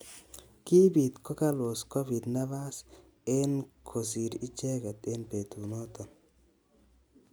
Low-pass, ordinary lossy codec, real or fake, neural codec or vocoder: none; none; real; none